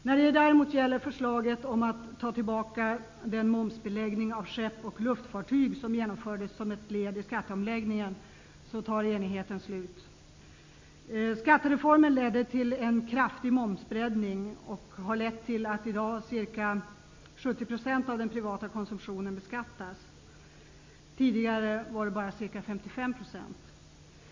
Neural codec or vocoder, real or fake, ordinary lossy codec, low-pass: none; real; MP3, 48 kbps; 7.2 kHz